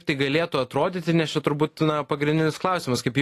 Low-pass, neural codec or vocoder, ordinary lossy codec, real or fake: 14.4 kHz; none; AAC, 48 kbps; real